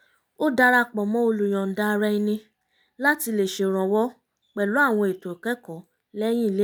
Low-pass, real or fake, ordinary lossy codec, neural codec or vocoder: none; real; none; none